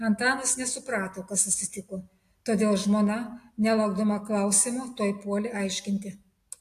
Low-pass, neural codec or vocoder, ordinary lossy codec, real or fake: 14.4 kHz; none; AAC, 64 kbps; real